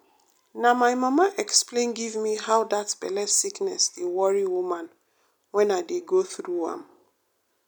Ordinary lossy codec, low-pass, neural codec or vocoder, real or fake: none; none; none; real